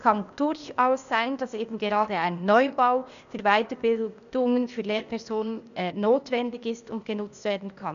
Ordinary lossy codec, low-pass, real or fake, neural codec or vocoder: none; 7.2 kHz; fake; codec, 16 kHz, 0.8 kbps, ZipCodec